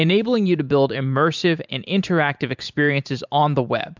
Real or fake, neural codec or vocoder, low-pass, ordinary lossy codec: fake; vocoder, 44.1 kHz, 128 mel bands every 512 samples, BigVGAN v2; 7.2 kHz; MP3, 64 kbps